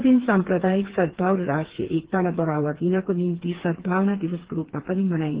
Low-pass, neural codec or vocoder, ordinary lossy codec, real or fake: 3.6 kHz; codec, 16 kHz, 4 kbps, FreqCodec, smaller model; Opus, 16 kbps; fake